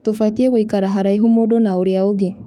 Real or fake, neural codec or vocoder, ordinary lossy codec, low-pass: fake; autoencoder, 48 kHz, 32 numbers a frame, DAC-VAE, trained on Japanese speech; none; 19.8 kHz